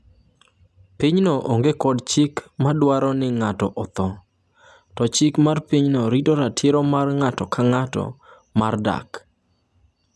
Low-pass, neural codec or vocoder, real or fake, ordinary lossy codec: none; none; real; none